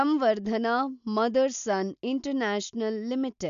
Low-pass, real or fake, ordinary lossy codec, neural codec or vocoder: 7.2 kHz; real; none; none